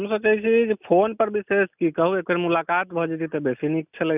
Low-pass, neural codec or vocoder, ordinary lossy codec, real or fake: 3.6 kHz; none; none; real